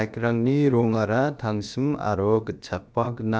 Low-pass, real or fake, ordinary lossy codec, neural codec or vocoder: none; fake; none; codec, 16 kHz, about 1 kbps, DyCAST, with the encoder's durations